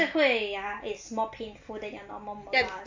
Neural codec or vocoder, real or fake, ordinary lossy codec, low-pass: none; real; AAC, 48 kbps; 7.2 kHz